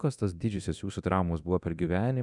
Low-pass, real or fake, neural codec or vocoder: 10.8 kHz; fake; codec, 24 kHz, 0.9 kbps, DualCodec